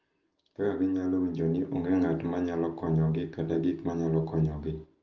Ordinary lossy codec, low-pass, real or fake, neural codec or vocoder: Opus, 32 kbps; 7.2 kHz; real; none